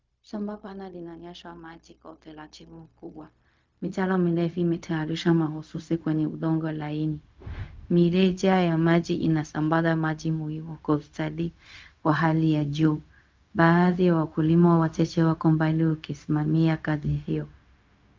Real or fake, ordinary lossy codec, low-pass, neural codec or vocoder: fake; Opus, 24 kbps; 7.2 kHz; codec, 16 kHz, 0.4 kbps, LongCat-Audio-Codec